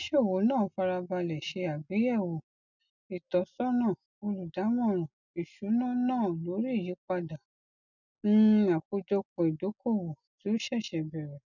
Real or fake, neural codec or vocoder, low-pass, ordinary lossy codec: real; none; 7.2 kHz; none